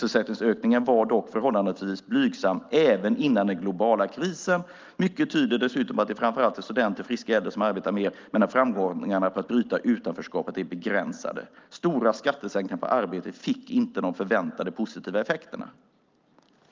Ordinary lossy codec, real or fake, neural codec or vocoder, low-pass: Opus, 24 kbps; real; none; 7.2 kHz